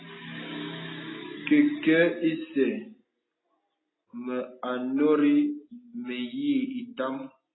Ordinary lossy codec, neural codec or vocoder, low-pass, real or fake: AAC, 16 kbps; none; 7.2 kHz; real